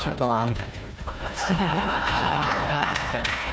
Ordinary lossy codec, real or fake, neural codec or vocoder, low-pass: none; fake; codec, 16 kHz, 1 kbps, FunCodec, trained on Chinese and English, 50 frames a second; none